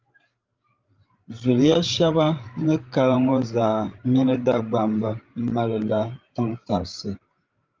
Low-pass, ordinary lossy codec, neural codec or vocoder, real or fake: 7.2 kHz; Opus, 32 kbps; codec, 16 kHz, 16 kbps, FreqCodec, larger model; fake